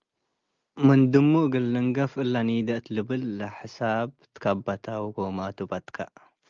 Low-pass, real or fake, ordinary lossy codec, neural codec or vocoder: 7.2 kHz; real; Opus, 32 kbps; none